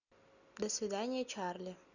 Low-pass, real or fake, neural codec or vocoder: 7.2 kHz; real; none